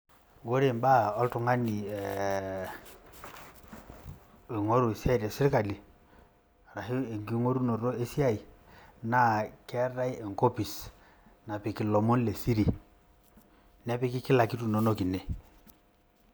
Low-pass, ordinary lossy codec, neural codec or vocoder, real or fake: none; none; none; real